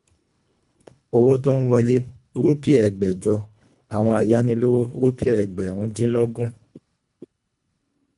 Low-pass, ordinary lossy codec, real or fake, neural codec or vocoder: 10.8 kHz; none; fake; codec, 24 kHz, 1.5 kbps, HILCodec